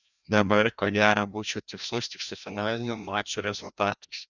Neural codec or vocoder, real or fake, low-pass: codec, 16 kHz, 1 kbps, FreqCodec, larger model; fake; 7.2 kHz